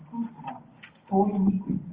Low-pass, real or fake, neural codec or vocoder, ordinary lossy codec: 3.6 kHz; real; none; Opus, 24 kbps